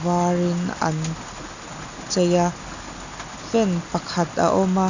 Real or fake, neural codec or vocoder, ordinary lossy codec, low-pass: real; none; none; 7.2 kHz